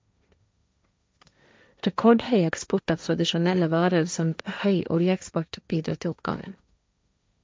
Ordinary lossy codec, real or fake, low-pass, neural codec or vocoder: none; fake; none; codec, 16 kHz, 1.1 kbps, Voila-Tokenizer